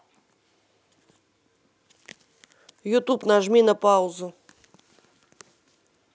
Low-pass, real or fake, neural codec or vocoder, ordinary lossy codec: none; real; none; none